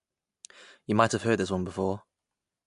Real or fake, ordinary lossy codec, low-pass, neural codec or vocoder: real; MP3, 64 kbps; 10.8 kHz; none